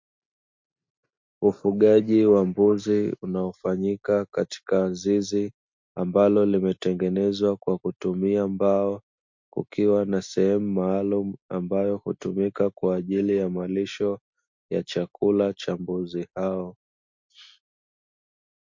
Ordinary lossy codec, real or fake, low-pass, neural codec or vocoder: MP3, 48 kbps; real; 7.2 kHz; none